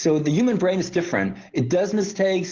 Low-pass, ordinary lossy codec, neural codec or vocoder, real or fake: 7.2 kHz; Opus, 16 kbps; none; real